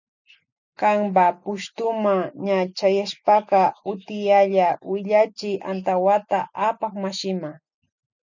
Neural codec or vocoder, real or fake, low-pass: none; real; 7.2 kHz